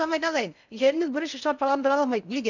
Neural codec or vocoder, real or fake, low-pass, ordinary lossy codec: codec, 16 kHz in and 24 kHz out, 0.6 kbps, FocalCodec, streaming, 4096 codes; fake; 7.2 kHz; none